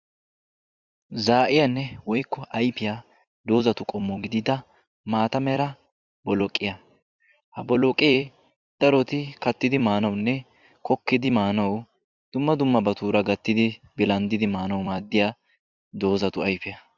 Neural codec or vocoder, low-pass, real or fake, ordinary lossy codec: vocoder, 44.1 kHz, 128 mel bands every 256 samples, BigVGAN v2; 7.2 kHz; fake; Opus, 64 kbps